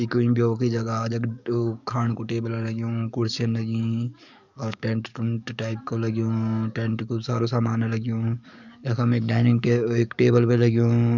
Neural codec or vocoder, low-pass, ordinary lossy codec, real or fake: codec, 44.1 kHz, 7.8 kbps, DAC; 7.2 kHz; none; fake